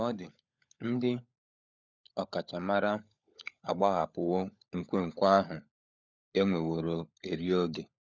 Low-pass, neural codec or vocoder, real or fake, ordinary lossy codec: 7.2 kHz; codec, 16 kHz, 16 kbps, FunCodec, trained on LibriTTS, 50 frames a second; fake; none